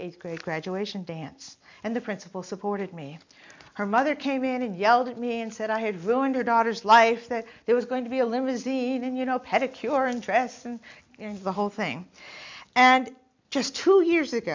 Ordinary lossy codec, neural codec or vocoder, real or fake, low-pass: MP3, 64 kbps; none; real; 7.2 kHz